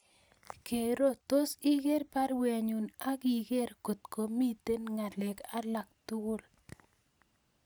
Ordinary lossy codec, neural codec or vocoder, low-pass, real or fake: none; none; none; real